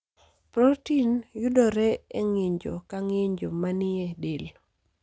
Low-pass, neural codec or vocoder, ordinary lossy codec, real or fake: none; none; none; real